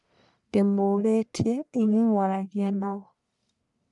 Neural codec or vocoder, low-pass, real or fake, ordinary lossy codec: codec, 44.1 kHz, 1.7 kbps, Pupu-Codec; 10.8 kHz; fake; none